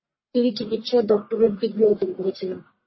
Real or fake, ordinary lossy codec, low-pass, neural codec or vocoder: fake; MP3, 24 kbps; 7.2 kHz; codec, 44.1 kHz, 1.7 kbps, Pupu-Codec